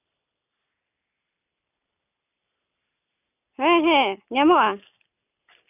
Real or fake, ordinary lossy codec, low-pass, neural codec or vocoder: real; none; 3.6 kHz; none